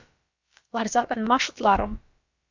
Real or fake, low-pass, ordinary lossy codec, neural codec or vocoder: fake; 7.2 kHz; Opus, 64 kbps; codec, 16 kHz, about 1 kbps, DyCAST, with the encoder's durations